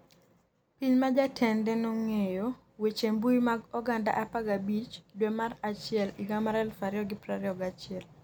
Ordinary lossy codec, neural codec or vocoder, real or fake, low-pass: none; none; real; none